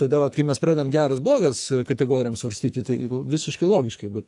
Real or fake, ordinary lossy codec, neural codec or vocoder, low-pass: fake; AAC, 64 kbps; codec, 44.1 kHz, 2.6 kbps, SNAC; 10.8 kHz